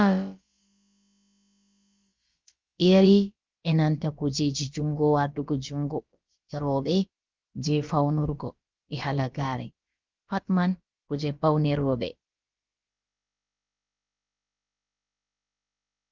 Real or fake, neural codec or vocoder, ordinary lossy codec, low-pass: fake; codec, 16 kHz, about 1 kbps, DyCAST, with the encoder's durations; Opus, 32 kbps; 7.2 kHz